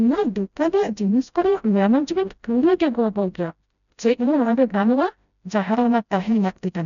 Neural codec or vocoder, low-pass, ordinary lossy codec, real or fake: codec, 16 kHz, 0.5 kbps, FreqCodec, smaller model; 7.2 kHz; none; fake